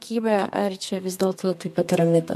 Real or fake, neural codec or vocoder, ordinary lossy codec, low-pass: fake; codec, 32 kHz, 1.9 kbps, SNAC; MP3, 64 kbps; 14.4 kHz